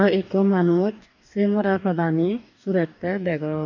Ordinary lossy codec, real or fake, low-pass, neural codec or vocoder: none; fake; 7.2 kHz; codec, 44.1 kHz, 2.6 kbps, DAC